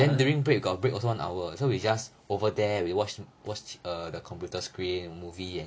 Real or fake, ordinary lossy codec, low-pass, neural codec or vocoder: real; none; none; none